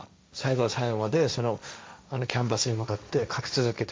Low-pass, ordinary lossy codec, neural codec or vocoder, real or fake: none; none; codec, 16 kHz, 1.1 kbps, Voila-Tokenizer; fake